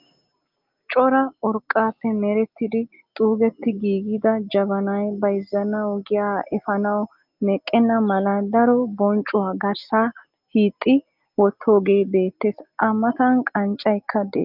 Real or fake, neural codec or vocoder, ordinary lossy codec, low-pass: real; none; Opus, 32 kbps; 5.4 kHz